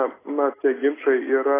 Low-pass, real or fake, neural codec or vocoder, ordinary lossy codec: 3.6 kHz; real; none; AAC, 16 kbps